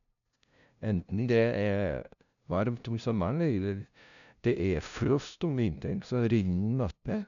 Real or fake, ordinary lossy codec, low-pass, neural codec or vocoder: fake; none; 7.2 kHz; codec, 16 kHz, 0.5 kbps, FunCodec, trained on LibriTTS, 25 frames a second